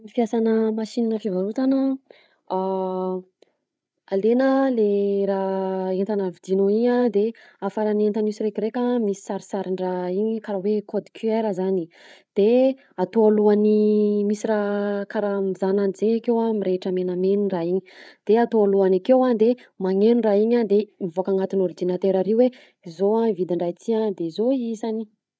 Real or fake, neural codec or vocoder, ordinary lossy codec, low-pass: fake; codec, 16 kHz, 8 kbps, FreqCodec, larger model; none; none